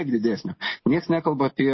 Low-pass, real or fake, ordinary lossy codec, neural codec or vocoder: 7.2 kHz; real; MP3, 24 kbps; none